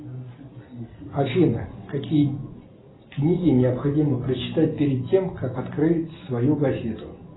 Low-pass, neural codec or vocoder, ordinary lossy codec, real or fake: 7.2 kHz; none; AAC, 16 kbps; real